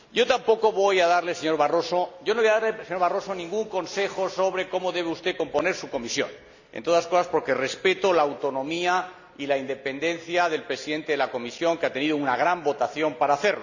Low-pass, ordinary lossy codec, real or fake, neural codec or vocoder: 7.2 kHz; none; real; none